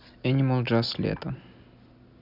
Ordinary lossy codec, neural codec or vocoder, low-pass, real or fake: none; none; 5.4 kHz; real